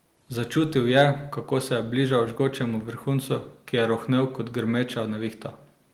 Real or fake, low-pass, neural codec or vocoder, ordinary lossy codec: real; 19.8 kHz; none; Opus, 24 kbps